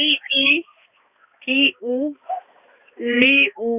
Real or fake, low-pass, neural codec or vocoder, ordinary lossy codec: fake; 3.6 kHz; codec, 16 kHz, 2 kbps, X-Codec, HuBERT features, trained on balanced general audio; none